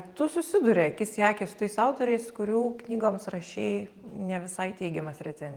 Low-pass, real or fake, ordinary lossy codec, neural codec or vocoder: 19.8 kHz; fake; Opus, 24 kbps; vocoder, 48 kHz, 128 mel bands, Vocos